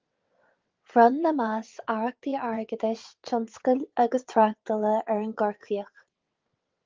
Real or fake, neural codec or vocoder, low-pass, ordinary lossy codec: fake; vocoder, 22.05 kHz, 80 mel bands, WaveNeXt; 7.2 kHz; Opus, 32 kbps